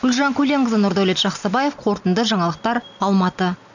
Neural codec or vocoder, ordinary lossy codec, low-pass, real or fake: none; none; 7.2 kHz; real